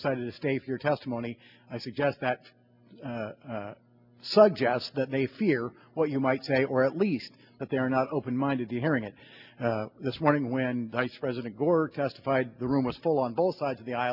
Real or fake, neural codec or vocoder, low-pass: real; none; 5.4 kHz